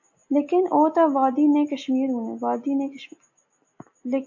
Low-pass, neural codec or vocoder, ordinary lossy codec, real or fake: 7.2 kHz; none; AAC, 48 kbps; real